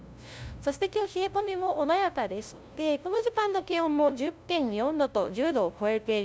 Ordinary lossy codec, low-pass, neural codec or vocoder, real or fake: none; none; codec, 16 kHz, 0.5 kbps, FunCodec, trained on LibriTTS, 25 frames a second; fake